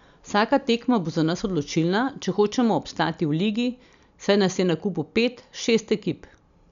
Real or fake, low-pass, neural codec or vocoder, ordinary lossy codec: real; 7.2 kHz; none; none